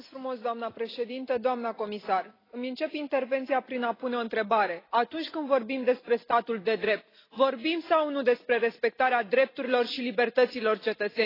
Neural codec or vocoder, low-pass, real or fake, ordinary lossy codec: none; 5.4 kHz; real; AAC, 24 kbps